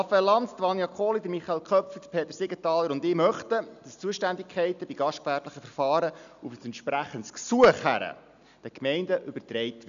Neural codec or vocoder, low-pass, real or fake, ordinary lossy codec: none; 7.2 kHz; real; none